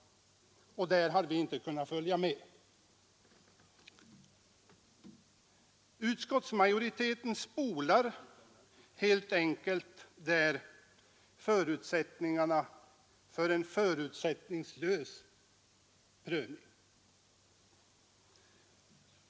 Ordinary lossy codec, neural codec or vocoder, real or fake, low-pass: none; none; real; none